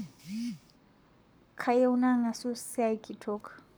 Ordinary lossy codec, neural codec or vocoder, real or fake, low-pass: none; codec, 44.1 kHz, 7.8 kbps, Pupu-Codec; fake; none